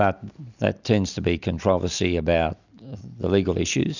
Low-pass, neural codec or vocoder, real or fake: 7.2 kHz; none; real